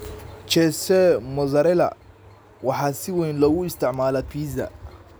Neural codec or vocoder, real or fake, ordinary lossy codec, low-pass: none; real; none; none